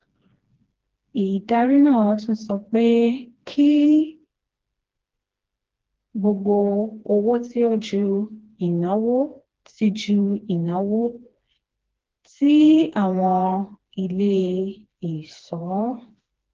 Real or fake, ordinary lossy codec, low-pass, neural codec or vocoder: fake; Opus, 16 kbps; 7.2 kHz; codec, 16 kHz, 2 kbps, FreqCodec, smaller model